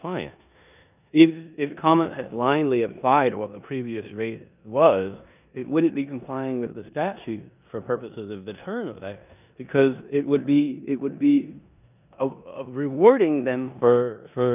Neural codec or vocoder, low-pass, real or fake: codec, 16 kHz in and 24 kHz out, 0.9 kbps, LongCat-Audio-Codec, four codebook decoder; 3.6 kHz; fake